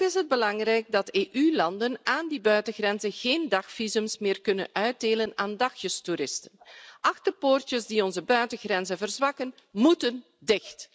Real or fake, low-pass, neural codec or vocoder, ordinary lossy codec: real; none; none; none